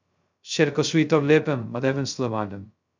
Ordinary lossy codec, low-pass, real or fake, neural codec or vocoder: MP3, 64 kbps; 7.2 kHz; fake; codec, 16 kHz, 0.2 kbps, FocalCodec